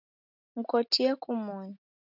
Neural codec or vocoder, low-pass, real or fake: none; 5.4 kHz; real